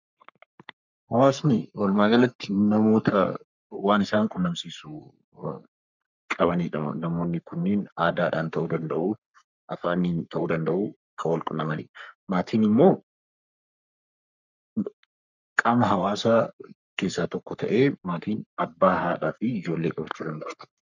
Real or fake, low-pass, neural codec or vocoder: fake; 7.2 kHz; codec, 44.1 kHz, 3.4 kbps, Pupu-Codec